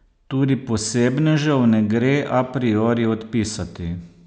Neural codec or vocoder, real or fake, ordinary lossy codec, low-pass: none; real; none; none